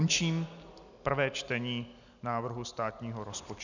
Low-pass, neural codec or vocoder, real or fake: 7.2 kHz; none; real